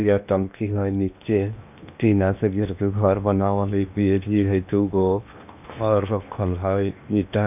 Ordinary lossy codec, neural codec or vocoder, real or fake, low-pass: none; codec, 16 kHz in and 24 kHz out, 0.8 kbps, FocalCodec, streaming, 65536 codes; fake; 3.6 kHz